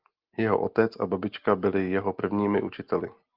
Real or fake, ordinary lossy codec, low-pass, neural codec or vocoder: real; Opus, 32 kbps; 5.4 kHz; none